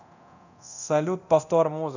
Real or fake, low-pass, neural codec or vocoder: fake; 7.2 kHz; codec, 24 kHz, 0.9 kbps, DualCodec